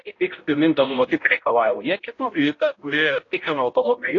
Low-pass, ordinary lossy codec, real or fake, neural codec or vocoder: 7.2 kHz; AAC, 32 kbps; fake; codec, 16 kHz, 0.5 kbps, X-Codec, HuBERT features, trained on balanced general audio